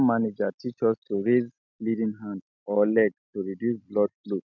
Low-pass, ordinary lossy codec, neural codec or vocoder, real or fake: 7.2 kHz; MP3, 64 kbps; none; real